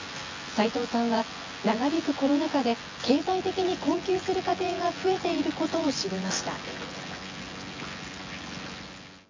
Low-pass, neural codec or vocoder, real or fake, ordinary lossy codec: 7.2 kHz; vocoder, 24 kHz, 100 mel bands, Vocos; fake; MP3, 48 kbps